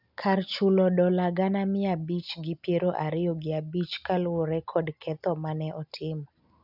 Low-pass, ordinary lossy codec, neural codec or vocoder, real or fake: 5.4 kHz; none; none; real